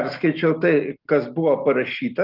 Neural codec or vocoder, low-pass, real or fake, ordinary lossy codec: none; 5.4 kHz; real; Opus, 32 kbps